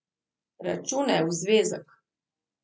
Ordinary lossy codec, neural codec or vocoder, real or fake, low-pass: none; none; real; none